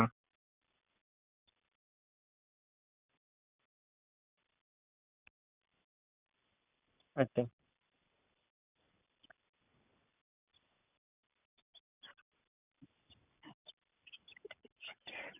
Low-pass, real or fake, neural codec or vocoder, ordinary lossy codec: 3.6 kHz; real; none; none